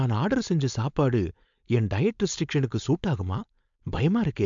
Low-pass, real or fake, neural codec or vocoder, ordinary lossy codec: 7.2 kHz; fake; codec, 16 kHz, 4.8 kbps, FACodec; MP3, 64 kbps